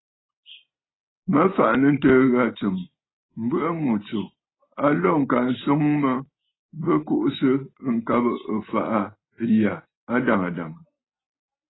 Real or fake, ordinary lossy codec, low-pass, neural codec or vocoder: real; AAC, 16 kbps; 7.2 kHz; none